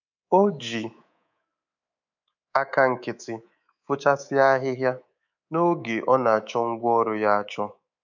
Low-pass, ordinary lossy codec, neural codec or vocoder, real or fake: 7.2 kHz; none; codec, 24 kHz, 3.1 kbps, DualCodec; fake